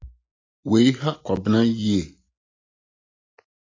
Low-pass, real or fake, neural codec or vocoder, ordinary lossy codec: 7.2 kHz; real; none; AAC, 32 kbps